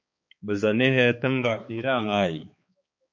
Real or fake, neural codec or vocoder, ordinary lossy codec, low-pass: fake; codec, 16 kHz, 4 kbps, X-Codec, HuBERT features, trained on balanced general audio; MP3, 48 kbps; 7.2 kHz